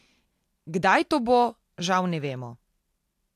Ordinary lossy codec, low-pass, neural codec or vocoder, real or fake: AAC, 64 kbps; 14.4 kHz; none; real